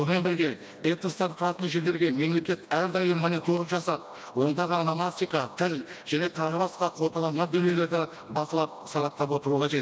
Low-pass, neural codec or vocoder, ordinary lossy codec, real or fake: none; codec, 16 kHz, 1 kbps, FreqCodec, smaller model; none; fake